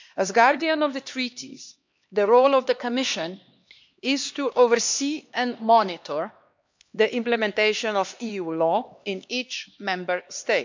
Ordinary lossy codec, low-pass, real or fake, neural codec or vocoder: MP3, 64 kbps; 7.2 kHz; fake; codec, 16 kHz, 2 kbps, X-Codec, HuBERT features, trained on LibriSpeech